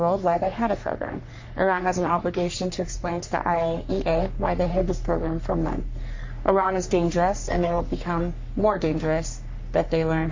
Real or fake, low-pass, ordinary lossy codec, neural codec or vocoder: fake; 7.2 kHz; MP3, 48 kbps; codec, 44.1 kHz, 3.4 kbps, Pupu-Codec